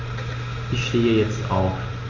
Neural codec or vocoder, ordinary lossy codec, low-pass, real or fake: none; Opus, 32 kbps; 7.2 kHz; real